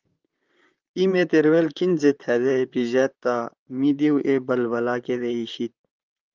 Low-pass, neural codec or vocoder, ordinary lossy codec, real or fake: 7.2 kHz; vocoder, 44.1 kHz, 128 mel bands, Pupu-Vocoder; Opus, 24 kbps; fake